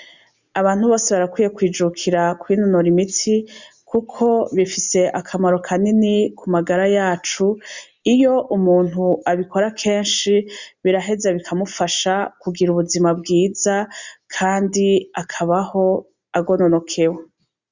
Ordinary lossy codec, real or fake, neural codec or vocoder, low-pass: Opus, 64 kbps; real; none; 7.2 kHz